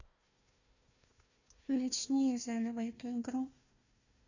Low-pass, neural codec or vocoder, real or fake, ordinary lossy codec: 7.2 kHz; codec, 16 kHz, 1 kbps, FunCodec, trained on Chinese and English, 50 frames a second; fake; none